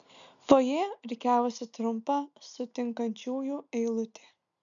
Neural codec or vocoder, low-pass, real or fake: none; 7.2 kHz; real